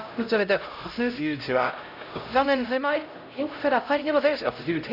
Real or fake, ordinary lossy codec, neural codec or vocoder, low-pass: fake; none; codec, 16 kHz, 0.5 kbps, X-Codec, HuBERT features, trained on LibriSpeech; 5.4 kHz